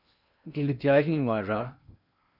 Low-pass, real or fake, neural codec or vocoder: 5.4 kHz; fake; codec, 16 kHz in and 24 kHz out, 0.6 kbps, FocalCodec, streaming, 2048 codes